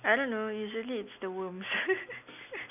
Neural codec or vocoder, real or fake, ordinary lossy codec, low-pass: none; real; none; 3.6 kHz